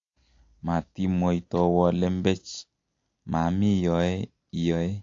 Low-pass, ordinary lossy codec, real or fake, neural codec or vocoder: 7.2 kHz; AAC, 48 kbps; real; none